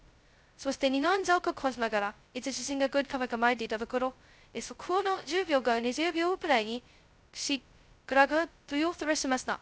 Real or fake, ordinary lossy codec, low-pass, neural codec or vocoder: fake; none; none; codec, 16 kHz, 0.2 kbps, FocalCodec